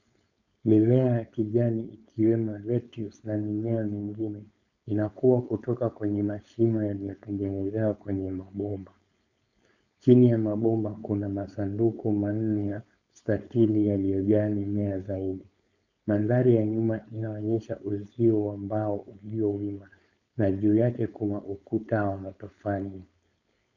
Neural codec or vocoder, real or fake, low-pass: codec, 16 kHz, 4.8 kbps, FACodec; fake; 7.2 kHz